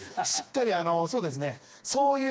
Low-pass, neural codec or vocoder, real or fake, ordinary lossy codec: none; codec, 16 kHz, 2 kbps, FreqCodec, smaller model; fake; none